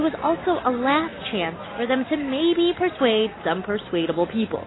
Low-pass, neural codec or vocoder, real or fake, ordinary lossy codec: 7.2 kHz; none; real; AAC, 16 kbps